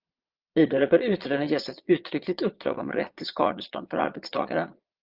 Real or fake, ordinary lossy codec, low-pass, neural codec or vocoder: fake; Opus, 24 kbps; 5.4 kHz; vocoder, 22.05 kHz, 80 mel bands, Vocos